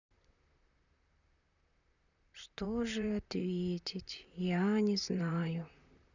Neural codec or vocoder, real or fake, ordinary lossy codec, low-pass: vocoder, 44.1 kHz, 128 mel bands, Pupu-Vocoder; fake; none; 7.2 kHz